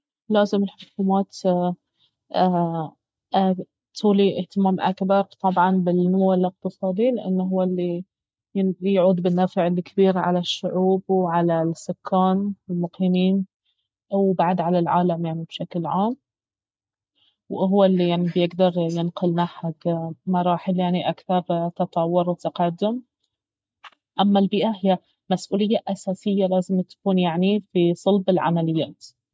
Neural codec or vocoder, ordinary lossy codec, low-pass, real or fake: none; none; none; real